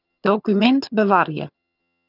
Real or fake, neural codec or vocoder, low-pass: fake; vocoder, 22.05 kHz, 80 mel bands, HiFi-GAN; 5.4 kHz